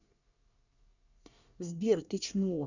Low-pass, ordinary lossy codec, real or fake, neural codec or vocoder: 7.2 kHz; none; fake; codec, 24 kHz, 1 kbps, SNAC